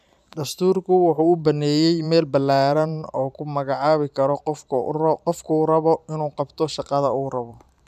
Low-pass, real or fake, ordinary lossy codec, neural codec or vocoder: 14.4 kHz; fake; none; vocoder, 44.1 kHz, 128 mel bands every 512 samples, BigVGAN v2